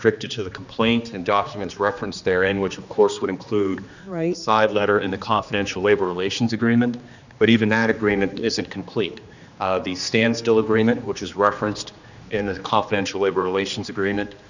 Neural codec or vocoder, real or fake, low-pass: codec, 16 kHz, 2 kbps, X-Codec, HuBERT features, trained on general audio; fake; 7.2 kHz